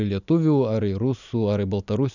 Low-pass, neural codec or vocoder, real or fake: 7.2 kHz; none; real